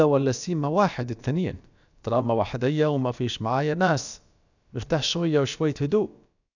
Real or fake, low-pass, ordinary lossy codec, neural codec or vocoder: fake; 7.2 kHz; none; codec, 16 kHz, about 1 kbps, DyCAST, with the encoder's durations